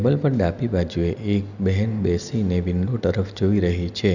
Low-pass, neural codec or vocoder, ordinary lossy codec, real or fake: 7.2 kHz; none; none; real